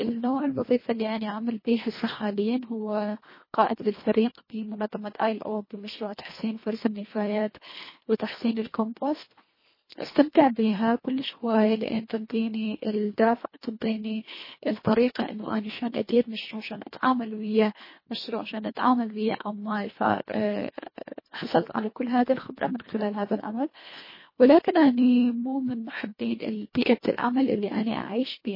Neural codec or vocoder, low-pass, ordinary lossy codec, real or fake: codec, 24 kHz, 1.5 kbps, HILCodec; 5.4 kHz; MP3, 24 kbps; fake